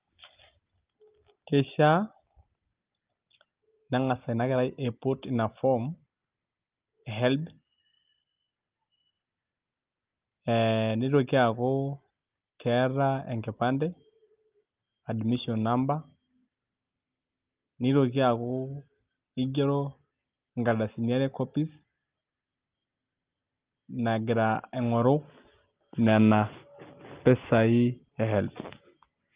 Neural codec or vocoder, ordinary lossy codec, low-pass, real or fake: none; Opus, 32 kbps; 3.6 kHz; real